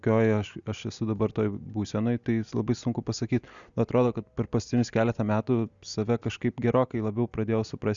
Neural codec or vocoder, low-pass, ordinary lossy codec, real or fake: none; 7.2 kHz; Opus, 64 kbps; real